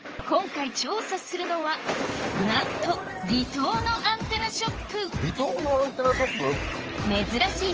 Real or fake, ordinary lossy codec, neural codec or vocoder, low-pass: fake; Opus, 24 kbps; codec, 16 kHz in and 24 kHz out, 2.2 kbps, FireRedTTS-2 codec; 7.2 kHz